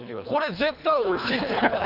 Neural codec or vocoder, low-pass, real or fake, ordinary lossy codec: codec, 24 kHz, 3 kbps, HILCodec; 5.4 kHz; fake; none